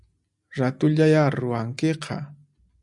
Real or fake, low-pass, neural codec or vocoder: real; 10.8 kHz; none